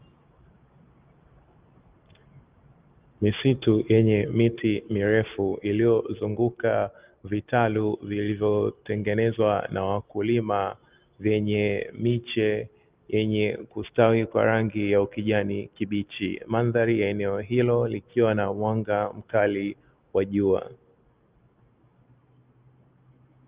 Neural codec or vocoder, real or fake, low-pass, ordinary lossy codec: none; real; 3.6 kHz; Opus, 32 kbps